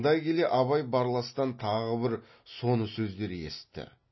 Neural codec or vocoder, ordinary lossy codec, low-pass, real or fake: none; MP3, 24 kbps; 7.2 kHz; real